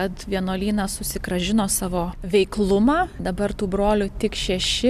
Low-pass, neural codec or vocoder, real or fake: 14.4 kHz; none; real